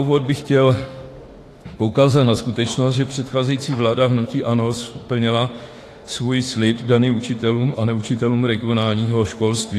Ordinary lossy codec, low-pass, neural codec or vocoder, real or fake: AAC, 48 kbps; 14.4 kHz; autoencoder, 48 kHz, 32 numbers a frame, DAC-VAE, trained on Japanese speech; fake